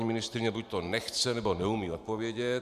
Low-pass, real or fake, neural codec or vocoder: 14.4 kHz; fake; vocoder, 44.1 kHz, 128 mel bands every 512 samples, BigVGAN v2